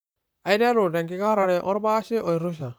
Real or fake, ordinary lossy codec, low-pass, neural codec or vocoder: fake; none; none; vocoder, 44.1 kHz, 128 mel bands, Pupu-Vocoder